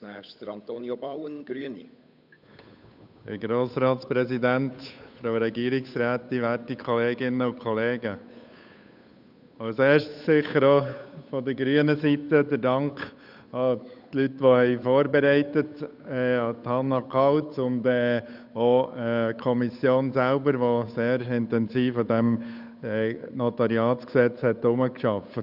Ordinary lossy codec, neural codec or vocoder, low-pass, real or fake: none; codec, 16 kHz, 8 kbps, FunCodec, trained on Chinese and English, 25 frames a second; 5.4 kHz; fake